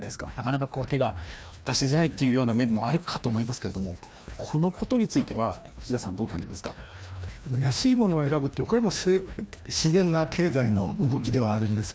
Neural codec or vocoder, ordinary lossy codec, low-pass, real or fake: codec, 16 kHz, 1 kbps, FreqCodec, larger model; none; none; fake